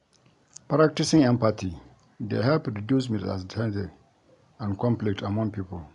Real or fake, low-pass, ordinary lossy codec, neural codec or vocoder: real; 10.8 kHz; none; none